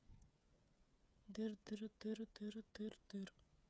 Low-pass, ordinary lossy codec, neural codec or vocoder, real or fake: none; none; codec, 16 kHz, 8 kbps, FreqCodec, smaller model; fake